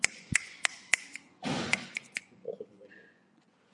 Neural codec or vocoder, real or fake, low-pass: vocoder, 24 kHz, 100 mel bands, Vocos; fake; 10.8 kHz